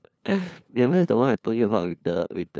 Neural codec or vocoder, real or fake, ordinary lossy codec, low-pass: codec, 16 kHz, 2 kbps, FunCodec, trained on LibriTTS, 25 frames a second; fake; none; none